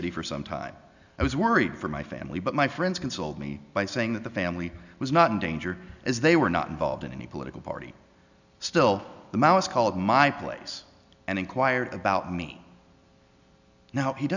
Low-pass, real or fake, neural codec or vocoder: 7.2 kHz; real; none